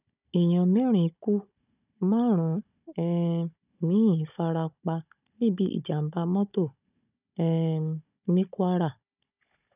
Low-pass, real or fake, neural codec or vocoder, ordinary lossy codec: 3.6 kHz; fake; codec, 16 kHz, 16 kbps, FunCodec, trained on Chinese and English, 50 frames a second; none